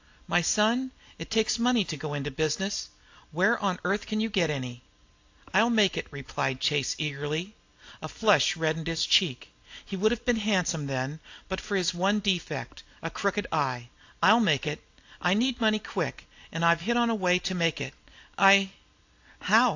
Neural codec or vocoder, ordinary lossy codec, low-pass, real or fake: none; AAC, 48 kbps; 7.2 kHz; real